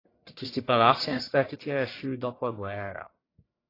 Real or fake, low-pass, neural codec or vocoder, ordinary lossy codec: fake; 5.4 kHz; codec, 44.1 kHz, 1.7 kbps, Pupu-Codec; AAC, 24 kbps